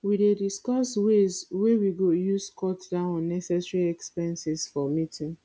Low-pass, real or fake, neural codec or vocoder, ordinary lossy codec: none; real; none; none